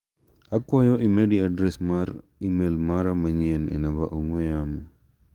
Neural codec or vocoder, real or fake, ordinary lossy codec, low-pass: codec, 44.1 kHz, 7.8 kbps, DAC; fake; Opus, 32 kbps; 19.8 kHz